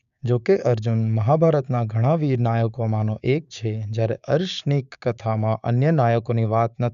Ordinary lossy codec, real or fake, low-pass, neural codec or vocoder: none; fake; 7.2 kHz; codec, 16 kHz, 6 kbps, DAC